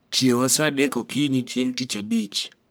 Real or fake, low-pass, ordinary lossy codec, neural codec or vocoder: fake; none; none; codec, 44.1 kHz, 1.7 kbps, Pupu-Codec